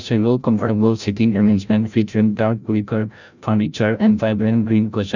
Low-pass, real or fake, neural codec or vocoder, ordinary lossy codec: 7.2 kHz; fake; codec, 16 kHz, 0.5 kbps, FreqCodec, larger model; none